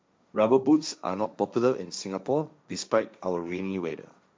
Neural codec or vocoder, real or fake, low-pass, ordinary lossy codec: codec, 16 kHz, 1.1 kbps, Voila-Tokenizer; fake; none; none